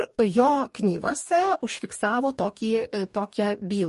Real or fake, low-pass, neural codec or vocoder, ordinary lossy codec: fake; 14.4 kHz; codec, 44.1 kHz, 2.6 kbps, DAC; MP3, 48 kbps